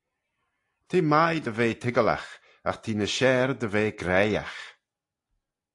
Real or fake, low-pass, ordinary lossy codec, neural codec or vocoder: real; 10.8 kHz; AAC, 48 kbps; none